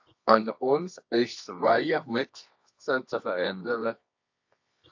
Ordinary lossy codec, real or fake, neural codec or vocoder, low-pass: AAC, 48 kbps; fake; codec, 24 kHz, 0.9 kbps, WavTokenizer, medium music audio release; 7.2 kHz